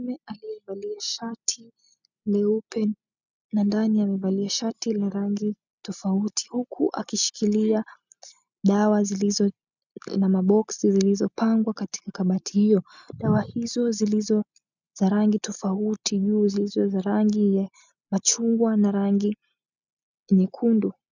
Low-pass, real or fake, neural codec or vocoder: 7.2 kHz; real; none